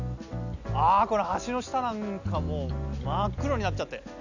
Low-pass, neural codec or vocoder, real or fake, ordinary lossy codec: 7.2 kHz; none; real; none